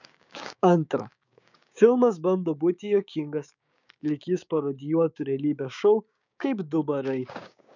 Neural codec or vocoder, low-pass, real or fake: codec, 16 kHz, 6 kbps, DAC; 7.2 kHz; fake